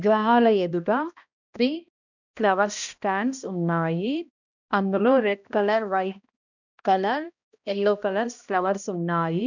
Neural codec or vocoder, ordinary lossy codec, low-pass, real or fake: codec, 16 kHz, 1 kbps, X-Codec, HuBERT features, trained on balanced general audio; none; 7.2 kHz; fake